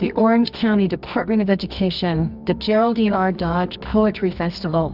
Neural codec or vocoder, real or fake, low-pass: codec, 24 kHz, 0.9 kbps, WavTokenizer, medium music audio release; fake; 5.4 kHz